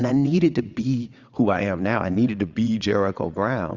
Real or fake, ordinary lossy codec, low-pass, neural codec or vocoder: fake; Opus, 64 kbps; 7.2 kHz; vocoder, 22.05 kHz, 80 mel bands, WaveNeXt